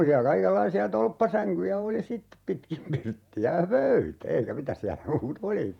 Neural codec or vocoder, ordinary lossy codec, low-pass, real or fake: vocoder, 48 kHz, 128 mel bands, Vocos; none; 19.8 kHz; fake